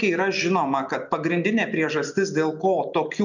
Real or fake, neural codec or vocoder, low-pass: real; none; 7.2 kHz